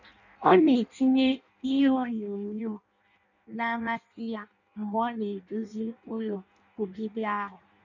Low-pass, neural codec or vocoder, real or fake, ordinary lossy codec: 7.2 kHz; codec, 16 kHz in and 24 kHz out, 0.6 kbps, FireRedTTS-2 codec; fake; none